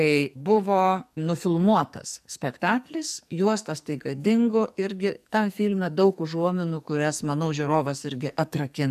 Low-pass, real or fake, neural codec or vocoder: 14.4 kHz; fake; codec, 44.1 kHz, 2.6 kbps, SNAC